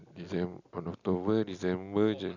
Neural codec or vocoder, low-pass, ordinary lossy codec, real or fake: none; 7.2 kHz; none; real